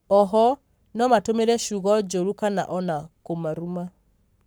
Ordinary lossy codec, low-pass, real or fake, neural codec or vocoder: none; none; fake; codec, 44.1 kHz, 7.8 kbps, Pupu-Codec